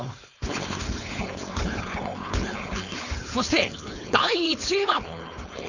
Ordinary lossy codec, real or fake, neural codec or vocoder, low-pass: none; fake; codec, 16 kHz, 4.8 kbps, FACodec; 7.2 kHz